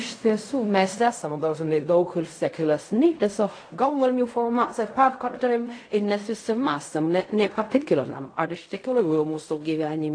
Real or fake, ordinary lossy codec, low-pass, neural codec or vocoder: fake; AAC, 48 kbps; 9.9 kHz; codec, 16 kHz in and 24 kHz out, 0.4 kbps, LongCat-Audio-Codec, fine tuned four codebook decoder